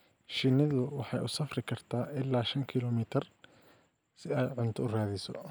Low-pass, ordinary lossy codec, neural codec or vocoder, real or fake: none; none; none; real